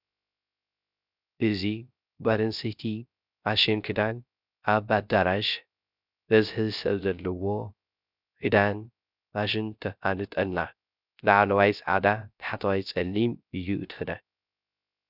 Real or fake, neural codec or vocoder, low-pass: fake; codec, 16 kHz, 0.3 kbps, FocalCodec; 5.4 kHz